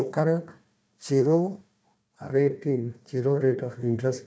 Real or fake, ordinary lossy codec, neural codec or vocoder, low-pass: fake; none; codec, 16 kHz, 1 kbps, FunCodec, trained on Chinese and English, 50 frames a second; none